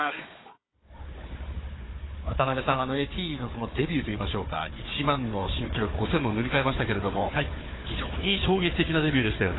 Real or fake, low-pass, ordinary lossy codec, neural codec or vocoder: fake; 7.2 kHz; AAC, 16 kbps; codec, 16 kHz, 4 kbps, FunCodec, trained on Chinese and English, 50 frames a second